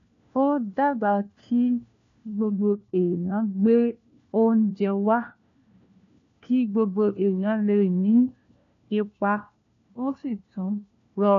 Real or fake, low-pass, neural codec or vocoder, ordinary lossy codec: fake; 7.2 kHz; codec, 16 kHz, 1 kbps, FunCodec, trained on LibriTTS, 50 frames a second; AAC, 64 kbps